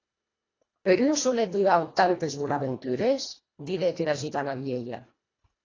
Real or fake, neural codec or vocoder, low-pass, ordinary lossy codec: fake; codec, 24 kHz, 1.5 kbps, HILCodec; 7.2 kHz; AAC, 32 kbps